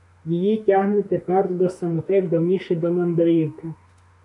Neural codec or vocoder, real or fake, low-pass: autoencoder, 48 kHz, 32 numbers a frame, DAC-VAE, trained on Japanese speech; fake; 10.8 kHz